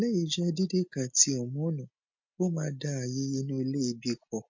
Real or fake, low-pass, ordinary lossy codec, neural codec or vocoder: real; 7.2 kHz; MP3, 48 kbps; none